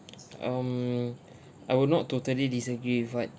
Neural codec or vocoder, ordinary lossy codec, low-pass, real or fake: none; none; none; real